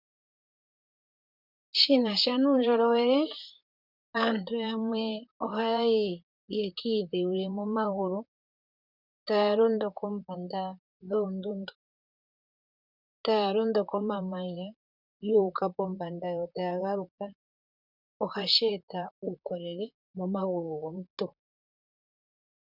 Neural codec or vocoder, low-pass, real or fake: vocoder, 44.1 kHz, 128 mel bands, Pupu-Vocoder; 5.4 kHz; fake